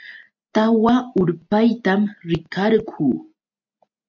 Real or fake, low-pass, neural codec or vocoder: real; 7.2 kHz; none